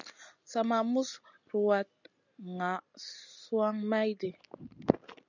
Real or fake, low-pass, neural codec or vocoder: real; 7.2 kHz; none